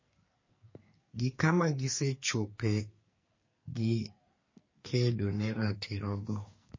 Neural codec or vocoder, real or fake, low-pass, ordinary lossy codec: codec, 44.1 kHz, 2.6 kbps, SNAC; fake; 7.2 kHz; MP3, 32 kbps